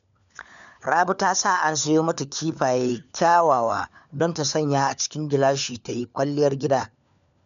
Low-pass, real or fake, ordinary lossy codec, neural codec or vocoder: 7.2 kHz; fake; MP3, 96 kbps; codec, 16 kHz, 4 kbps, FunCodec, trained on LibriTTS, 50 frames a second